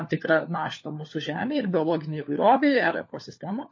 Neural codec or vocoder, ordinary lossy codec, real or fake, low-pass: codec, 16 kHz, 4 kbps, FunCodec, trained on LibriTTS, 50 frames a second; MP3, 32 kbps; fake; 7.2 kHz